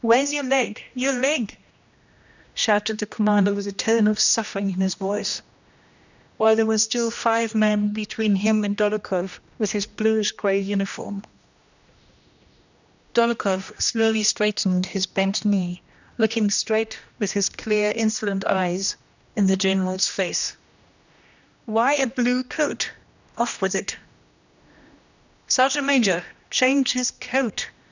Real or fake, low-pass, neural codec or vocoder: fake; 7.2 kHz; codec, 16 kHz, 1 kbps, X-Codec, HuBERT features, trained on general audio